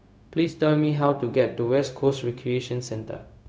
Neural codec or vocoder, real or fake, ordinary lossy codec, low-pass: codec, 16 kHz, 0.4 kbps, LongCat-Audio-Codec; fake; none; none